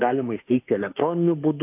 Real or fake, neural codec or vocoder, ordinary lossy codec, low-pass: fake; autoencoder, 48 kHz, 32 numbers a frame, DAC-VAE, trained on Japanese speech; AAC, 32 kbps; 3.6 kHz